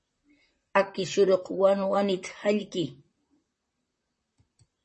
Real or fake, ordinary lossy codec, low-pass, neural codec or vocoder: fake; MP3, 32 kbps; 10.8 kHz; vocoder, 44.1 kHz, 128 mel bands, Pupu-Vocoder